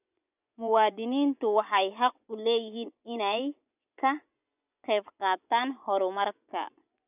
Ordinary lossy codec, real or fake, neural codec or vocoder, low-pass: none; fake; vocoder, 24 kHz, 100 mel bands, Vocos; 3.6 kHz